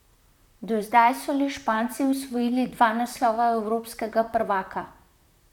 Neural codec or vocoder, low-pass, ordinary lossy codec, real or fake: vocoder, 44.1 kHz, 128 mel bands, Pupu-Vocoder; 19.8 kHz; none; fake